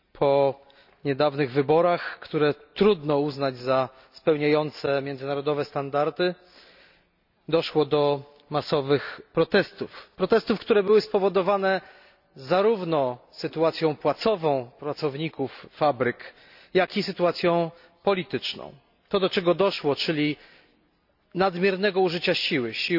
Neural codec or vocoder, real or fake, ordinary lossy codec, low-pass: none; real; none; 5.4 kHz